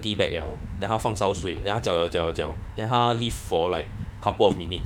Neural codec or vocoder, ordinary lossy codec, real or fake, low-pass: autoencoder, 48 kHz, 32 numbers a frame, DAC-VAE, trained on Japanese speech; none; fake; 19.8 kHz